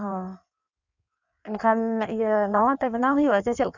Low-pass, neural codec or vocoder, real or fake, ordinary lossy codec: 7.2 kHz; codec, 16 kHz in and 24 kHz out, 1.1 kbps, FireRedTTS-2 codec; fake; none